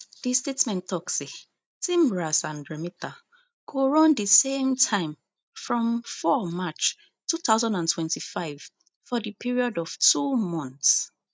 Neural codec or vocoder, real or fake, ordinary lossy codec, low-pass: none; real; none; none